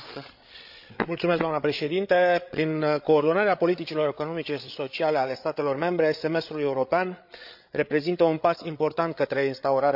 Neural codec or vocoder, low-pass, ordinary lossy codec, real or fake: codec, 16 kHz, 8 kbps, FreqCodec, larger model; 5.4 kHz; none; fake